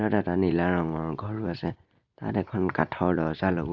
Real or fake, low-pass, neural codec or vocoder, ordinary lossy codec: real; 7.2 kHz; none; none